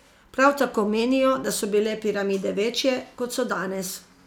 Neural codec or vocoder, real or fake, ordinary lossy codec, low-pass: none; real; none; 19.8 kHz